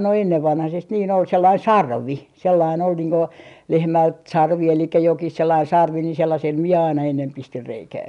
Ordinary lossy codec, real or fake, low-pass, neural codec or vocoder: none; real; 10.8 kHz; none